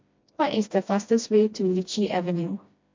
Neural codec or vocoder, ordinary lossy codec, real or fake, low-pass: codec, 16 kHz, 1 kbps, FreqCodec, smaller model; MP3, 48 kbps; fake; 7.2 kHz